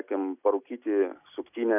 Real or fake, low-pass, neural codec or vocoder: real; 3.6 kHz; none